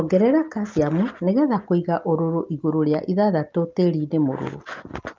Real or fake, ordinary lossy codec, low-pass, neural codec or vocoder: real; Opus, 24 kbps; 7.2 kHz; none